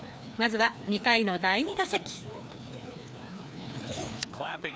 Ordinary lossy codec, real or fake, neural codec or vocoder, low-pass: none; fake; codec, 16 kHz, 2 kbps, FreqCodec, larger model; none